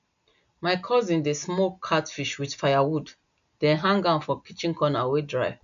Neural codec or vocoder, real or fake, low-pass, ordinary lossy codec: none; real; 7.2 kHz; none